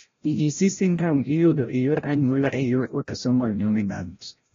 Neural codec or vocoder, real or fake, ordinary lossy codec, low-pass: codec, 16 kHz, 0.5 kbps, FreqCodec, larger model; fake; AAC, 32 kbps; 7.2 kHz